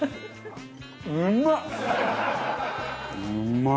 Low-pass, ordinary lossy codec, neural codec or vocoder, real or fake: none; none; none; real